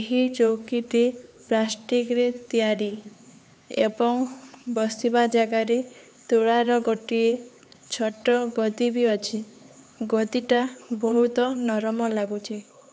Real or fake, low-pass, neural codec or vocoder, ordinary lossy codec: fake; none; codec, 16 kHz, 4 kbps, X-Codec, HuBERT features, trained on LibriSpeech; none